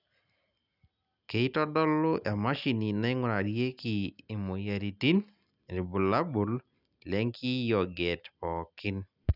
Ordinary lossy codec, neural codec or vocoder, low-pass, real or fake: none; none; 5.4 kHz; real